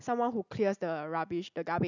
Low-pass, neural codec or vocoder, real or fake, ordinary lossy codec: 7.2 kHz; none; real; none